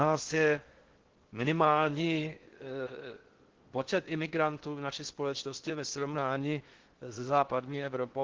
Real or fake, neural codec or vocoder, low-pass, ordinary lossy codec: fake; codec, 16 kHz in and 24 kHz out, 0.6 kbps, FocalCodec, streaming, 4096 codes; 7.2 kHz; Opus, 16 kbps